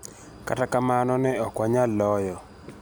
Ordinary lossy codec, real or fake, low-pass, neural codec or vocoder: none; real; none; none